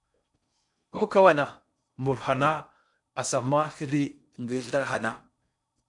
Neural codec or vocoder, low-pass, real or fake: codec, 16 kHz in and 24 kHz out, 0.6 kbps, FocalCodec, streaming, 4096 codes; 10.8 kHz; fake